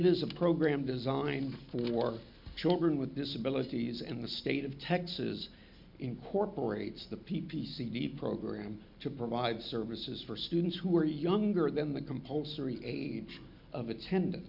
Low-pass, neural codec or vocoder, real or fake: 5.4 kHz; none; real